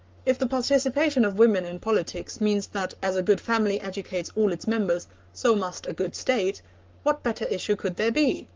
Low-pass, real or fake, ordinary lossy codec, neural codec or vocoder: 7.2 kHz; fake; Opus, 32 kbps; codec, 44.1 kHz, 7.8 kbps, Pupu-Codec